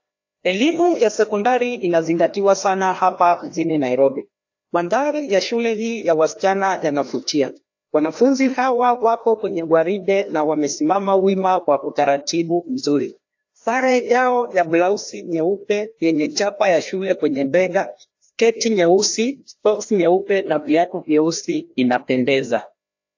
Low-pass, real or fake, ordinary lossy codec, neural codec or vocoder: 7.2 kHz; fake; AAC, 48 kbps; codec, 16 kHz, 1 kbps, FreqCodec, larger model